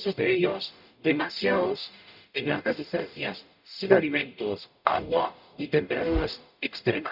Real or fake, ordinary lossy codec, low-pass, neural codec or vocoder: fake; none; 5.4 kHz; codec, 44.1 kHz, 0.9 kbps, DAC